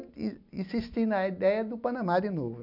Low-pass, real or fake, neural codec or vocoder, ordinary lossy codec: 5.4 kHz; real; none; none